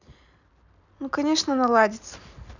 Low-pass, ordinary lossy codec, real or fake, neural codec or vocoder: 7.2 kHz; none; real; none